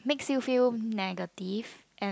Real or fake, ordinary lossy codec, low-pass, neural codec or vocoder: real; none; none; none